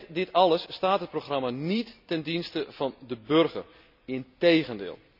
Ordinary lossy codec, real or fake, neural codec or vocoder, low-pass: none; real; none; 5.4 kHz